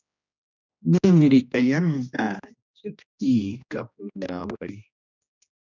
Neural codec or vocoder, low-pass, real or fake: codec, 16 kHz, 1 kbps, X-Codec, HuBERT features, trained on general audio; 7.2 kHz; fake